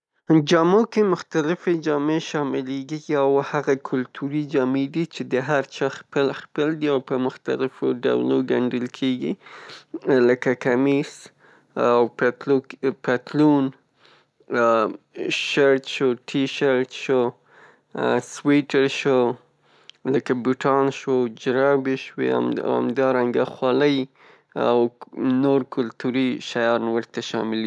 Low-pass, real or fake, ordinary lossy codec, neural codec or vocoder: none; real; none; none